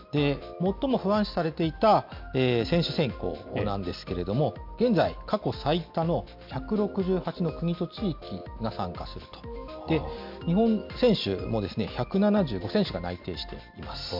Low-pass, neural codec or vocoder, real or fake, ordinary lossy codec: 5.4 kHz; none; real; MP3, 48 kbps